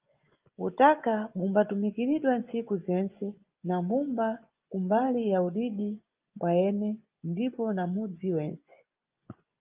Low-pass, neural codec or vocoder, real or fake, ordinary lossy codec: 3.6 kHz; vocoder, 24 kHz, 100 mel bands, Vocos; fake; Opus, 24 kbps